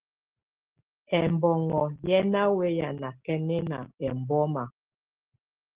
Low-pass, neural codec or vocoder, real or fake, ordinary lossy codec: 3.6 kHz; none; real; Opus, 16 kbps